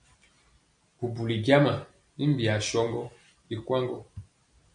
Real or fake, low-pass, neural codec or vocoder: real; 9.9 kHz; none